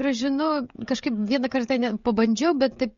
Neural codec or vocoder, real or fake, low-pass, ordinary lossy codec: codec, 16 kHz, 16 kbps, FreqCodec, smaller model; fake; 7.2 kHz; MP3, 48 kbps